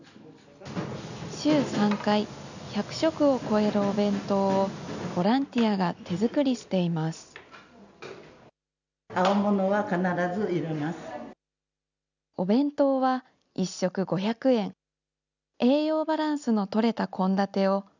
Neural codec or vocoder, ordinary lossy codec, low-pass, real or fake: none; none; 7.2 kHz; real